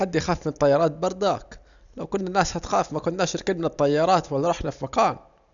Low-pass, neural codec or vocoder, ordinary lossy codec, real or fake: 7.2 kHz; none; none; real